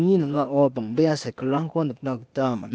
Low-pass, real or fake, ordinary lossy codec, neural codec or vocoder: none; fake; none; codec, 16 kHz, 0.8 kbps, ZipCodec